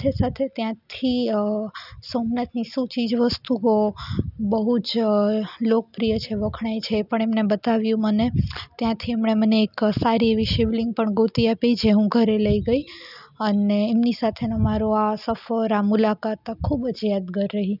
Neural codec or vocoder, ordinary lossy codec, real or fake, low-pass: none; none; real; 5.4 kHz